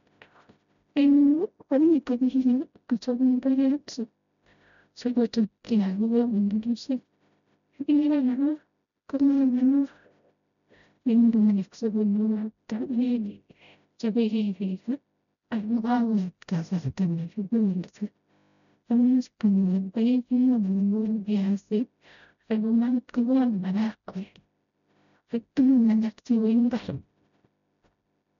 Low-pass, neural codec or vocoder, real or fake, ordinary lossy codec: 7.2 kHz; codec, 16 kHz, 0.5 kbps, FreqCodec, smaller model; fake; none